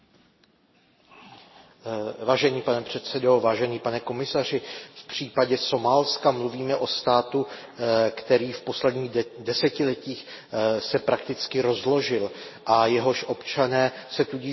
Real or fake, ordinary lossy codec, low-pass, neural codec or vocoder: real; MP3, 24 kbps; 7.2 kHz; none